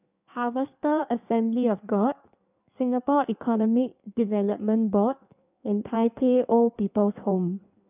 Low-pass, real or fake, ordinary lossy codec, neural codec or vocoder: 3.6 kHz; fake; none; codec, 16 kHz in and 24 kHz out, 1.1 kbps, FireRedTTS-2 codec